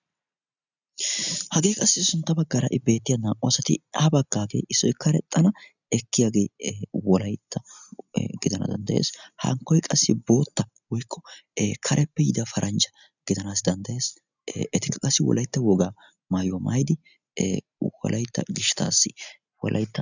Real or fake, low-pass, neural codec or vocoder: real; 7.2 kHz; none